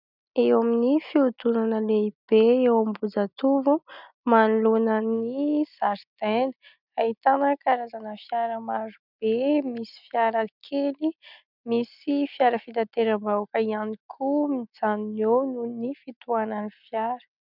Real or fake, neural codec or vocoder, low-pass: real; none; 5.4 kHz